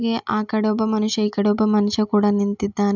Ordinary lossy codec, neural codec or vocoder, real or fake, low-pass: none; none; real; 7.2 kHz